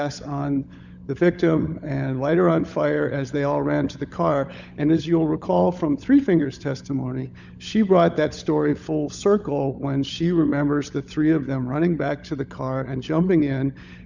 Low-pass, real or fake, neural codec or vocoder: 7.2 kHz; fake; codec, 16 kHz, 16 kbps, FunCodec, trained on LibriTTS, 50 frames a second